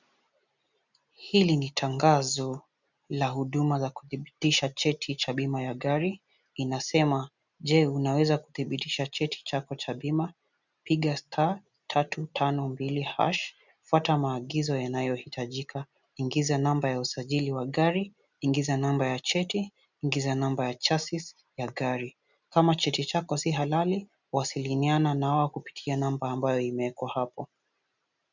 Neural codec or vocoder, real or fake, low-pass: none; real; 7.2 kHz